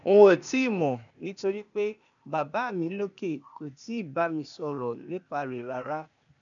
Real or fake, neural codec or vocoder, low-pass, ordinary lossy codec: fake; codec, 16 kHz, 0.8 kbps, ZipCodec; 7.2 kHz; none